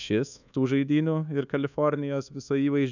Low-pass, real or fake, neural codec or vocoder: 7.2 kHz; fake; codec, 24 kHz, 1.2 kbps, DualCodec